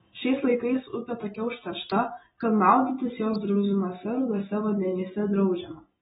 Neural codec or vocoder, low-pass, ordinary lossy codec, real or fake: none; 19.8 kHz; AAC, 16 kbps; real